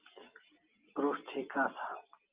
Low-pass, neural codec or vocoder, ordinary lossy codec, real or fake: 3.6 kHz; none; Opus, 24 kbps; real